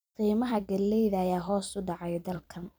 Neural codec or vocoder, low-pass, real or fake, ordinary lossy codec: vocoder, 44.1 kHz, 128 mel bands every 256 samples, BigVGAN v2; none; fake; none